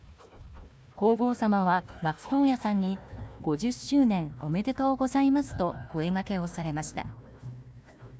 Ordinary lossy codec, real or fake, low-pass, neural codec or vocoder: none; fake; none; codec, 16 kHz, 1 kbps, FunCodec, trained on Chinese and English, 50 frames a second